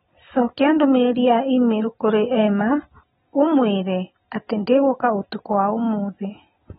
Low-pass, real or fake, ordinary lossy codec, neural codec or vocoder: 19.8 kHz; real; AAC, 16 kbps; none